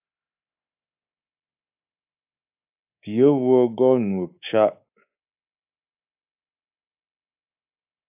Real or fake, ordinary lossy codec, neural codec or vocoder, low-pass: fake; AAC, 32 kbps; codec, 24 kHz, 3.1 kbps, DualCodec; 3.6 kHz